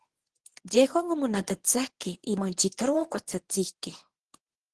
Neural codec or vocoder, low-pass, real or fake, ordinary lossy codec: codec, 24 kHz, 0.9 kbps, WavTokenizer, medium speech release version 1; 10.8 kHz; fake; Opus, 16 kbps